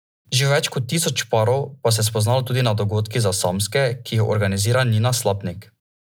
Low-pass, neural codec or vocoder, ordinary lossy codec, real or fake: none; none; none; real